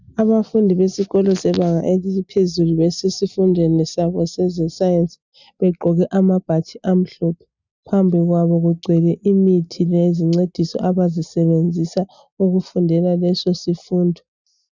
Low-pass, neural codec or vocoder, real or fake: 7.2 kHz; none; real